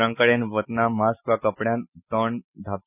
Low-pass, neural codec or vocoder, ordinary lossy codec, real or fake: 3.6 kHz; none; none; real